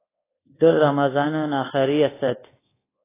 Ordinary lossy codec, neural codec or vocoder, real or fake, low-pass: AAC, 16 kbps; codec, 24 kHz, 1.2 kbps, DualCodec; fake; 3.6 kHz